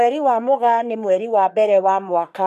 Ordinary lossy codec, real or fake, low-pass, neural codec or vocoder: none; fake; 14.4 kHz; codec, 44.1 kHz, 3.4 kbps, Pupu-Codec